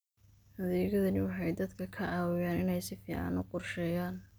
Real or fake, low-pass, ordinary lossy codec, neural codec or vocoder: real; none; none; none